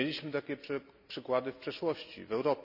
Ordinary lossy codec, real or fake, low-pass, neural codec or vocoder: none; real; 5.4 kHz; none